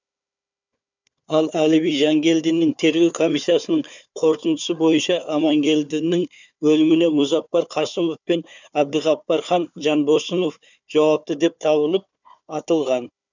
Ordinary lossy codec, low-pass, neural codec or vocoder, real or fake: none; 7.2 kHz; codec, 16 kHz, 4 kbps, FunCodec, trained on Chinese and English, 50 frames a second; fake